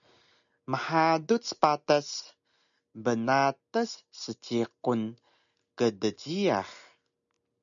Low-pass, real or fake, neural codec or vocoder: 7.2 kHz; real; none